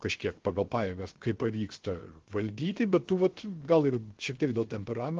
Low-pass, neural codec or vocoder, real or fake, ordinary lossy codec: 7.2 kHz; codec, 16 kHz, 0.7 kbps, FocalCodec; fake; Opus, 16 kbps